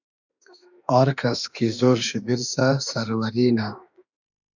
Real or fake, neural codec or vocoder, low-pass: fake; autoencoder, 48 kHz, 32 numbers a frame, DAC-VAE, trained on Japanese speech; 7.2 kHz